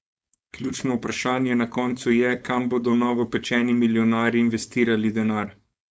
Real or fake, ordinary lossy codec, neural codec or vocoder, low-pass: fake; none; codec, 16 kHz, 4.8 kbps, FACodec; none